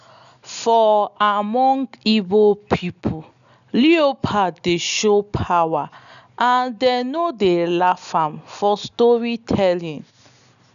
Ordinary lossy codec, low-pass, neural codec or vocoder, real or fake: none; 7.2 kHz; none; real